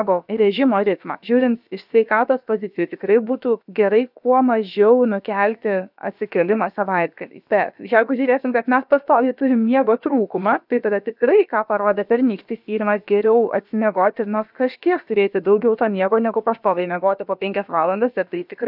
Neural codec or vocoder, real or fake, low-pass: codec, 16 kHz, about 1 kbps, DyCAST, with the encoder's durations; fake; 5.4 kHz